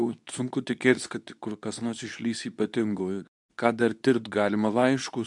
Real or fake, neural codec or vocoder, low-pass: fake; codec, 24 kHz, 0.9 kbps, WavTokenizer, medium speech release version 2; 10.8 kHz